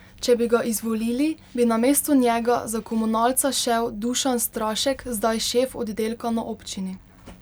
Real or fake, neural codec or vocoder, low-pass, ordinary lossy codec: real; none; none; none